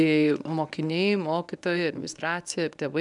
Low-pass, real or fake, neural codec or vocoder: 10.8 kHz; fake; codec, 24 kHz, 0.9 kbps, WavTokenizer, medium speech release version 1